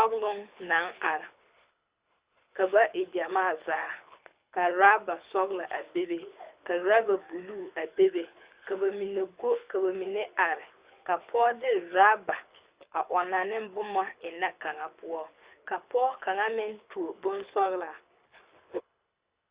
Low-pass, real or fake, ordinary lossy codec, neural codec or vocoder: 3.6 kHz; fake; Opus, 64 kbps; codec, 24 kHz, 6 kbps, HILCodec